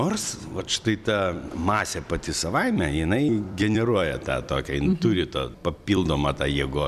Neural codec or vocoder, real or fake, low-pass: vocoder, 44.1 kHz, 128 mel bands every 256 samples, BigVGAN v2; fake; 14.4 kHz